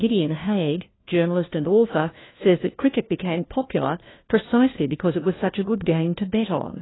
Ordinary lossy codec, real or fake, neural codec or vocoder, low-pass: AAC, 16 kbps; fake; codec, 16 kHz, 1 kbps, FunCodec, trained on LibriTTS, 50 frames a second; 7.2 kHz